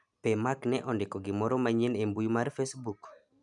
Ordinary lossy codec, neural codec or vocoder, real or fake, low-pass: none; none; real; 10.8 kHz